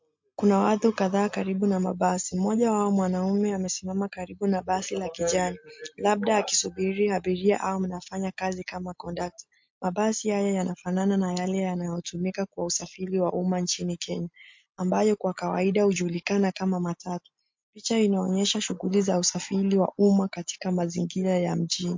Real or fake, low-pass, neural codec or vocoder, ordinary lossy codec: real; 7.2 kHz; none; MP3, 48 kbps